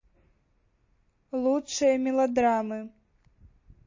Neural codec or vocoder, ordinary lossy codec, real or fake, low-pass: none; MP3, 32 kbps; real; 7.2 kHz